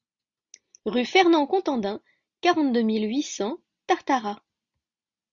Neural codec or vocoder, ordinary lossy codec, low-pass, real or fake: none; Opus, 64 kbps; 7.2 kHz; real